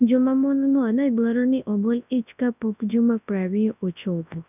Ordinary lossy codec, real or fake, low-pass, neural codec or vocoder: none; fake; 3.6 kHz; codec, 24 kHz, 0.9 kbps, WavTokenizer, large speech release